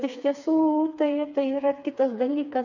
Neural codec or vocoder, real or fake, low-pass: codec, 16 kHz, 4 kbps, FreqCodec, smaller model; fake; 7.2 kHz